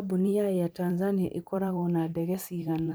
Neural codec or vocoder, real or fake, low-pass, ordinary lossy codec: vocoder, 44.1 kHz, 128 mel bands every 512 samples, BigVGAN v2; fake; none; none